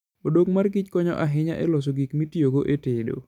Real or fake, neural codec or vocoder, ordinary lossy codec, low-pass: real; none; none; 19.8 kHz